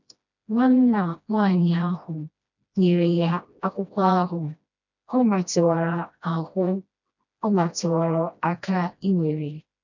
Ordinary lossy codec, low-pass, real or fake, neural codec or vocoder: none; 7.2 kHz; fake; codec, 16 kHz, 1 kbps, FreqCodec, smaller model